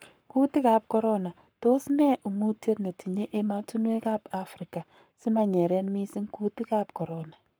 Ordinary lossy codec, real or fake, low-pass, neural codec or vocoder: none; fake; none; codec, 44.1 kHz, 7.8 kbps, Pupu-Codec